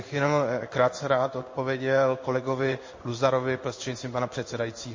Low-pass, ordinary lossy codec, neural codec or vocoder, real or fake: 7.2 kHz; MP3, 32 kbps; codec, 16 kHz in and 24 kHz out, 1 kbps, XY-Tokenizer; fake